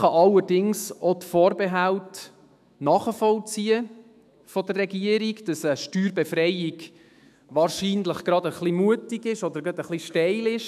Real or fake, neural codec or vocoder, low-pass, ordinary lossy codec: fake; autoencoder, 48 kHz, 128 numbers a frame, DAC-VAE, trained on Japanese speech; 14.4 kHz; none